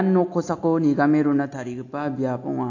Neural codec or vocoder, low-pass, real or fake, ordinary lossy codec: none; 7.2 kHz; real; AAC, 48 kbps